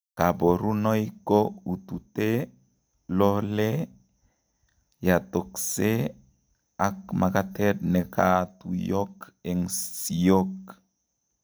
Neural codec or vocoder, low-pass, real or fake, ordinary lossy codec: none; none; real; none